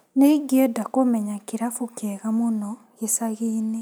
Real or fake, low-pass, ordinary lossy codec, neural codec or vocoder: real; none; none; none